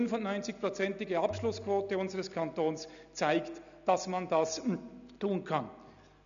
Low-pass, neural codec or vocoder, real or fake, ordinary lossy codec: 7.2 kHz; none; real; none